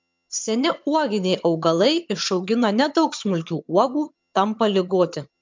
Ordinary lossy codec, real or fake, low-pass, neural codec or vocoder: MP3, 64 kbps; fake; 7.2 kHz; vocoder, 22.05 kHz, 80 mel bands, HiFi-GAN